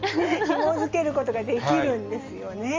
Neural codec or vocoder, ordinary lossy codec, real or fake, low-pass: none; Opus, 32 kbps; real; 7.2 kHz